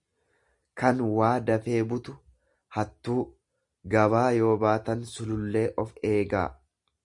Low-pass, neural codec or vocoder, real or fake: 10.8 kHz; none; real